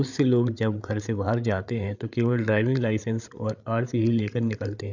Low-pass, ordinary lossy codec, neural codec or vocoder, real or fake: 7.2 kHz; none; codec, 16 kHz, 8 kbps, FreqCodec, larger model; fake